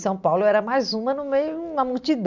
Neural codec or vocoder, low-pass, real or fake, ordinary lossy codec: none; 7.2 kHz; real; none